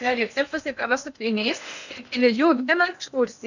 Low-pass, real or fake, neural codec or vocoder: 7.2 kHz; fake; codec, 16 kHz in and 24 kHz out, 0.8 kbps, FocalCodec, streaming, 65536 codes